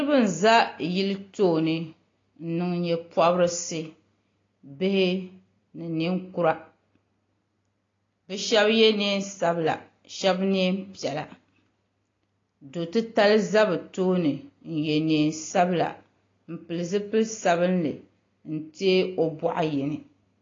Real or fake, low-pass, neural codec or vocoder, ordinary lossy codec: real; 7.2 kHz; none; AAC, 32 kbps